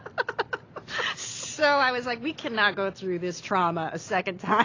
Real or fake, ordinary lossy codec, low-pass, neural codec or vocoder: real; AAC, 32 kbps; 7.2 kHz; none